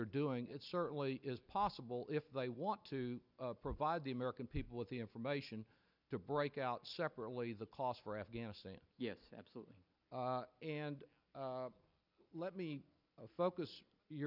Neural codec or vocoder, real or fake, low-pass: none; real; 5.4 kHz